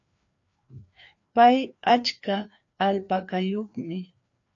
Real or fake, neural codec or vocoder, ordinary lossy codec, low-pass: fake; codec, 16 kHz, 2 kbps, FreqCodec, larger model; AAC, 48 kbps; 7.2 kHz